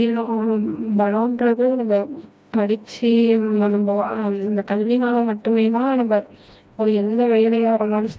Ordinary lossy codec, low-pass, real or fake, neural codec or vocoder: none; none; fake; codec, 16 kHz, 1 kbps, FreqCodec, smaller model